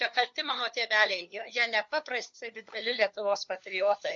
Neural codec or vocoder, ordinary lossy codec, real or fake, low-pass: codec, 16 kHz, 4 kbps, FunCodec, trained on LibriTTS, 50 frames a second; MP3, 48 kbps; fake; 7.2 kHz